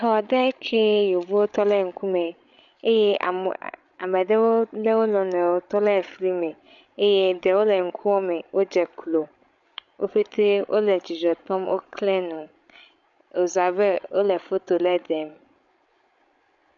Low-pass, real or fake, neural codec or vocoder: 7.2 kHz; fake; codec, 16 kHz, 16 kbps, FreqCodec, larger model